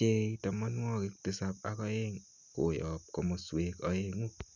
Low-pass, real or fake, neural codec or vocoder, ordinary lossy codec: 7.2 kHz; real; none; none